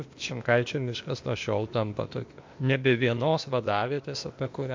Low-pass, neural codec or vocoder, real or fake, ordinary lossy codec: 7.2 kHz; codec, 16 kHz, 0.8 kbps, ZipCodec; fake; MP3, 48 kbps